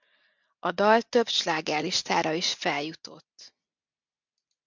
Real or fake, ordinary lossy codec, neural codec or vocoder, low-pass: real; MP3, 64 kbps; none; 7.2 kHz